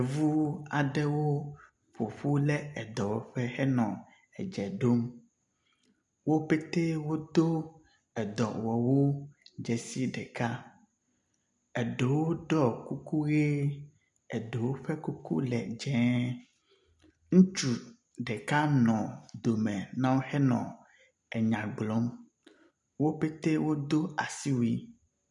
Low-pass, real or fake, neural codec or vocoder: 10.8 kHz; real; none